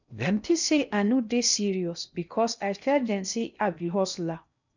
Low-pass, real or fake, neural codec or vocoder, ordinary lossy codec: 7.2 kHz; fake; codec, 16 kHz in and 24 kHz out, 0.6 kbps, FocalCodec, streaming, 2048 codes; none